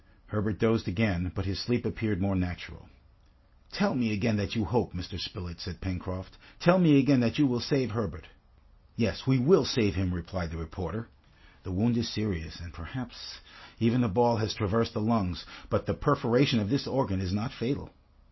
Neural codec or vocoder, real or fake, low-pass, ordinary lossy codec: none; real; 7.2 kHz; MP3, 24 kbps